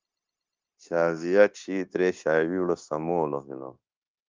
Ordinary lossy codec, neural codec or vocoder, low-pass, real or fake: Opus, 24 kbps; codec, 16 kHz, 0.9 kbps, LongCat-Audio-Codec; 7.2 kHz; fake